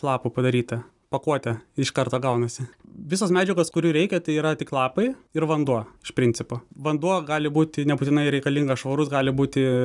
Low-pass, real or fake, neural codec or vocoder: 10.8 kHz; real; none